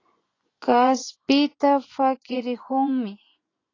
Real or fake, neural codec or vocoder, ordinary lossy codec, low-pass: fake; vocoder, 24 kHz, 100 mel bands, Vocos; AAC, 32 kbps; 7.2 kHz